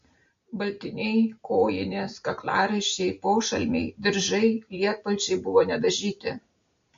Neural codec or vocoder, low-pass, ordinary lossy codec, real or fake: none; 7.2 kHz; MP3, 48 kbps; real